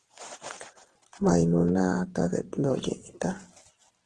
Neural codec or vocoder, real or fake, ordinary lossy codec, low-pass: vocoder, 44.1 kHz, 128 mel bands every 512 samples, BigVGAN v2; fake; Opus, 16 kbps; 10.8 kHz